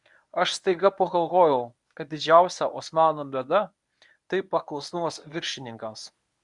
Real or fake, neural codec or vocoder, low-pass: fake; codec, 24 kHz, 0.9 kbps, WavTokenizer, medium speech release version 1; 10.8 kHz